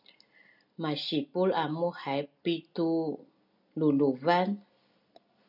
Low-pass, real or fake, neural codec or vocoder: 5.4 kHz; real; none